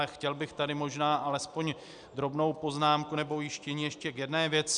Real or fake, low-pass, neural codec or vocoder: real; 9.9 kHz; none